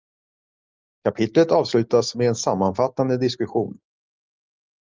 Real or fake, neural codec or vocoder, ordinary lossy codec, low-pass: real; none; Opus, 32 kbps; 7.2 kHz